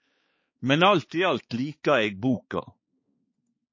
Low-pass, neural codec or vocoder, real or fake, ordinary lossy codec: 7.2 kHz; codec, 16 kHz, 4 kbps, X-Codec, HuBERT features, trained on balanced general audio; fake; MP3, 32 kbps